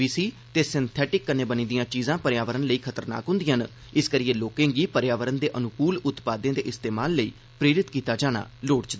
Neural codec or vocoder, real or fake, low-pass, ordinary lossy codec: none; real; none; none